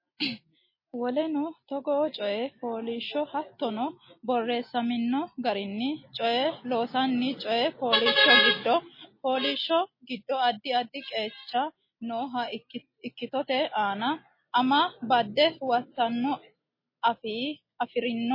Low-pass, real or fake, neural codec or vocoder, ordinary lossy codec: 5.4 kHz; real; none; MP3, 24 kbps